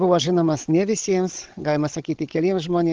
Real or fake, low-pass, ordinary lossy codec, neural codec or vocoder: fake; 7.2 kHz; Opus, 16 kbps; codec, 16 kHz, 16 kbps, FreqCodec, larger model